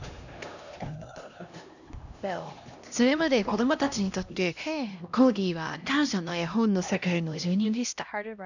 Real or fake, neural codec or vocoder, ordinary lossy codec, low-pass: fake; codec, 16 kHz, 1 kbps, X-Codec, HuBERT features, trained on LibriSpeech; none; 7.2 kHz